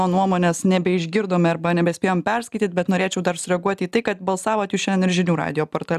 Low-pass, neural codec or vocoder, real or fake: 14.4 kHz; none; real